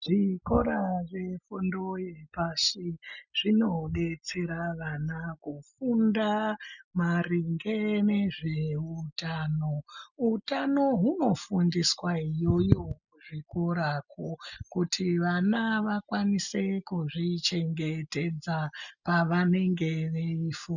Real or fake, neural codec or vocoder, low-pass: real; none; 7.2 kHz